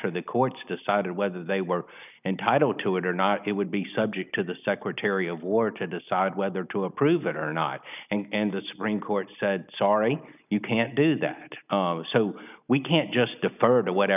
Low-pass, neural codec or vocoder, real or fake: 3.6 kHz; none; real